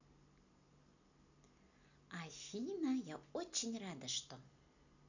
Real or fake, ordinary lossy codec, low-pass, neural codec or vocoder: real; none; 7.2 kHz; none